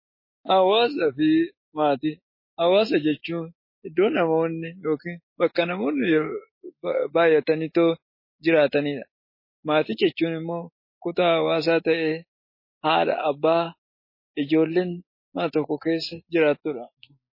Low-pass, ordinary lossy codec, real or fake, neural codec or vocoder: 5.4 kHz; MP3, 24 kbps; real; none